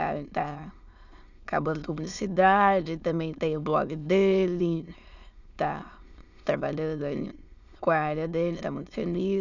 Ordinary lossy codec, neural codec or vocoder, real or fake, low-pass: none; autoencoder, 22.05 kHz, a latent of 192 numbers a frame, VITS, trained on many speakers; fake; 7.2 kHz